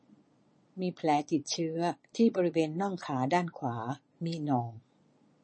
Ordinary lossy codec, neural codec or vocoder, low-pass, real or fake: MP3, 32 kbps; vocoder, 22.05 kHz, 80 mel bands, Vocos; 9.9 kHz; fake